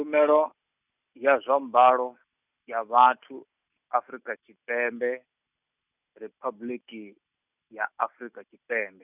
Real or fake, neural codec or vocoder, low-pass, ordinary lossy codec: real; none; 3.6 kHz; none